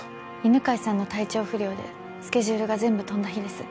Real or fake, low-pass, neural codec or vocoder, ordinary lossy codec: real; none; none; none